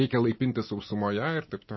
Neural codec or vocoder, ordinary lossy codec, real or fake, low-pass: none; MP3, 24 kbps; real; 7.2 kHz